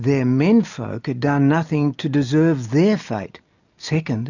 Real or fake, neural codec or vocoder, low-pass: real; none; 7.2 kHz